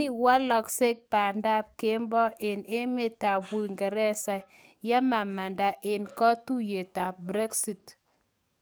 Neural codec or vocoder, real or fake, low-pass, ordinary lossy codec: codec, 44.1 kHz, 7.8 kbps, DAC; fake; none; none